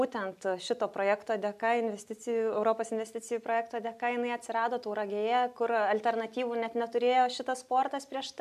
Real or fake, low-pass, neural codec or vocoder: real; 14.4 kHz; none